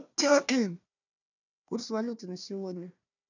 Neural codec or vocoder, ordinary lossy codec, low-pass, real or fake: codec, 16 kHz, 1 kbps, FunCodec, trained on Chinese and English, 50 frames a second; none; 7.2 kHz; fake